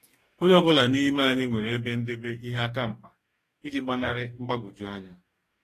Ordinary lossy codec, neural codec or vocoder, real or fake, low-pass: AAC, 48 kbps; codec, 44.1 kHz, 2.6 kbps, DAC; fake; 14.4 kHz